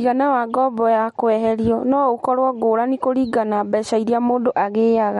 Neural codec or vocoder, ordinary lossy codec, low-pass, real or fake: none; MP3, 48 kbps; 19.8 kHz; real